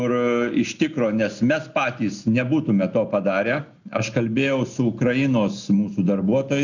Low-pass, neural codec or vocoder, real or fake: 7.2 kHz; none; real